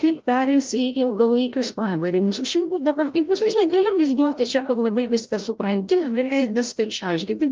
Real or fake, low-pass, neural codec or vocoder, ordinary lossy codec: fake; 7.2 kHz; codec, 16 kHz, 0.5 kbps, FreqCodec, larger model; Opus, 32 kbps